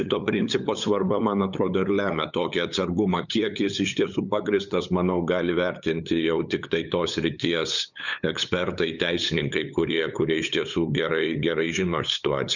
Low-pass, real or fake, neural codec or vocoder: 7.2 kHz; fake; codec, 16 kHz, 8 kbps, FunCodec, trained on LibriTTS, 25 frames a second